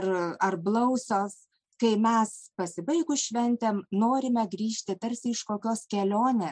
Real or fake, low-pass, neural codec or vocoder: real; 9.9 kHz; none